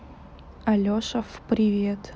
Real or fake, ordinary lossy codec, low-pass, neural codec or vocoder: real; none; none; none